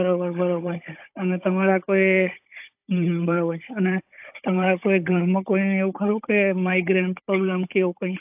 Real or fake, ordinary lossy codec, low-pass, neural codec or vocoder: fake; AAC, 32 kbps; 3.6 kHz; codec, 16 kHz, 16 kbps, FunCodec, trained on Chinese and English, 50 frames a second